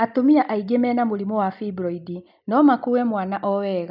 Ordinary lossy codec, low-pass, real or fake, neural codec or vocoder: none; 5.4 kHz; real; none